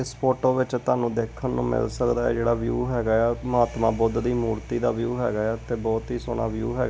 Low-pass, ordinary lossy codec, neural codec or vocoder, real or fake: none; none; none; real